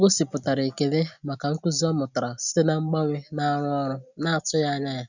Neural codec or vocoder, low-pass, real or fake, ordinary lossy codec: none; 7.2 kHz; real; none